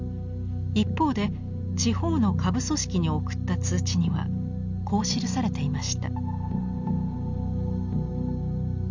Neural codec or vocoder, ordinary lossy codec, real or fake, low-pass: none; none; real; 7.2 kHz